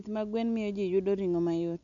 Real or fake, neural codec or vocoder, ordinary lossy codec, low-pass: real; none; none; 7.2 kHz